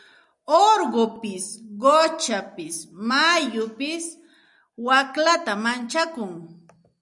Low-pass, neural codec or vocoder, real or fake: 10.8 kHz; none; real